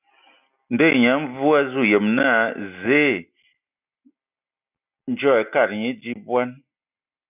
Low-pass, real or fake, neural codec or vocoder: 3.6 kHz; real; none